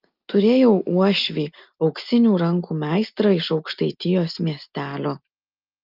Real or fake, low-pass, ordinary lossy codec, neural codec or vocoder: real; 5.4 kHz; Opus, 24 kbps; none